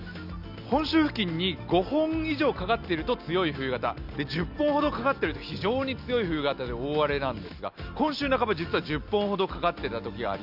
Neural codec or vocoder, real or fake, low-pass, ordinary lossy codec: none; real; 5.4 kHz; none